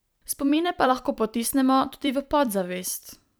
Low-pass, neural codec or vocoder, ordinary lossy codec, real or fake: none; vocoder, 44.1 kHz, 128 mel bands every 256 samples, BigVGAN v2; none; fake